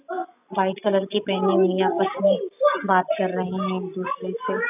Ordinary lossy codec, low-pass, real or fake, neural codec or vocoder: none; 3.6 kHz; real; none